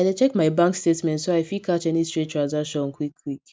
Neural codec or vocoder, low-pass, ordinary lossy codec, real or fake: none; none; none; real